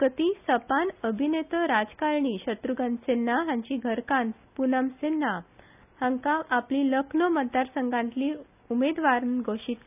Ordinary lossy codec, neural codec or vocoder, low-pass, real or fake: none; none; 3.6 kHz; real